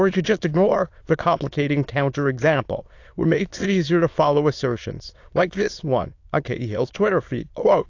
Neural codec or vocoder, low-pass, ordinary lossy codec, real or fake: autoencoder, 22.05 kHz, a latent of 192 numbers a frame, VITS, trained on many speakers; 7.2 kHz; AAC, 48 kbps; fake